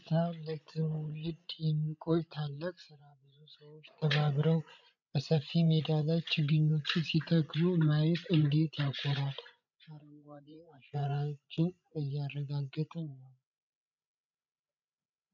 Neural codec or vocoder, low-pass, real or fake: codec, 16 kHz, 16 kbps, FreqCodec, larger model; 7.2 kHz; fake